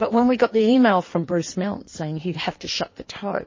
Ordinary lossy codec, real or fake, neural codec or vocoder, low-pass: MP3, 32 kbps; fake; codec, 16 kHz in and 24 kHz out, 1.1 kbps, FireRedTTS-2 codec; 7.2 kHz